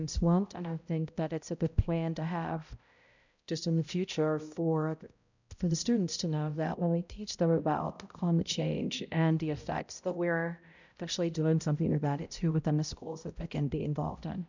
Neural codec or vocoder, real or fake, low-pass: codec, 16 kHz, 0.5 kbps, X-Codec, HuBERT features, trained on balanced general audio; fake; 7.2 kHz